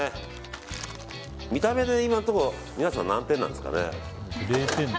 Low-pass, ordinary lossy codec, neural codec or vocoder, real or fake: none; none; none; real